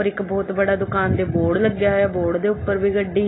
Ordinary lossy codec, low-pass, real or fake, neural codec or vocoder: AAC, 16 kbps; 7.2 kHz; real; none